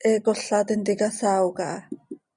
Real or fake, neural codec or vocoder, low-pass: real; none; 9.9 kHz